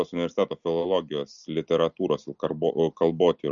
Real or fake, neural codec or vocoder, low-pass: real; none; 7.2 kHz